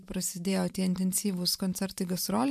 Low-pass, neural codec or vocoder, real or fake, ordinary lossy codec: 14.4 kHz; vocoder, 44.1 kHz, 128 mel bands every 512 samples, BigVGAN v2; fake; MP3, 96 kbps